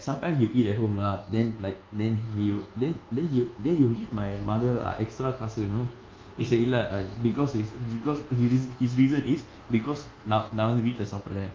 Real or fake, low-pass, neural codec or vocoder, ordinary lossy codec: fake; 7.2 kHz; codec, 24 kHz, 1.2 kbps, DualCodec; Opus, 32 kbps